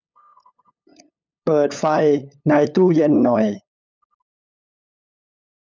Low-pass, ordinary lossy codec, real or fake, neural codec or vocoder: none; none; fake; codec, 16 kHz, 8 kbps, FunCodec, trained on LibriTTS, 25 frames a second